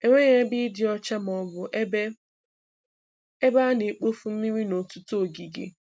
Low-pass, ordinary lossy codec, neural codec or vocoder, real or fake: none; none; none; real